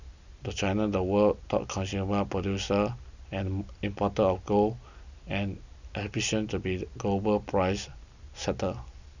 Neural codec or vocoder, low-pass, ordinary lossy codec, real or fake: none; 7.2 kHz; none; real